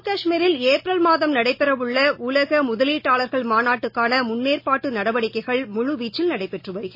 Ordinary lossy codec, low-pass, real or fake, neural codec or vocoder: MP3, 24 kbps; 5.4 kHz; real; none